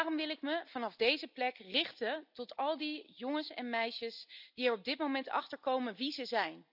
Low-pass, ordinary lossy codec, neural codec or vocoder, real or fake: 5.4 kHz; none; none; real